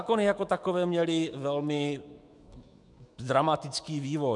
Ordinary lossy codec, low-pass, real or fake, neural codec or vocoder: MP3, 96 kbps; 10.8 kHz; fake; autoencoder, 48 kHz, 128 numbers a frame, DAC-VAE, trained on Japanese speech